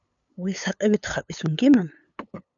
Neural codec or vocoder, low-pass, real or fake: codec, 16 kHz, 8 kbps, FunCodec, trained on LibriTTS, 25 frames a second; 7.2 kHz; fake